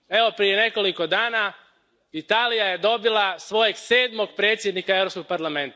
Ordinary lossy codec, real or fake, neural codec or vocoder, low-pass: none; real; none; none